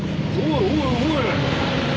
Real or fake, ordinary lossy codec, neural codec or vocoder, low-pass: real; none; none; none